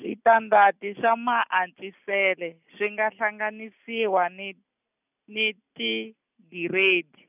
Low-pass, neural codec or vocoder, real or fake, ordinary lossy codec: 3.6 kHz; none; real; none